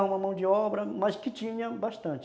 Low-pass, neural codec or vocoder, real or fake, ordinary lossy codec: none; none; real; none